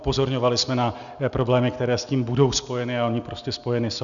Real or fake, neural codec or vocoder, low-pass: real; none; 7.2 kHz